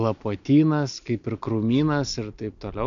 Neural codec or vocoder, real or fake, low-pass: none; real; 7.2 kHz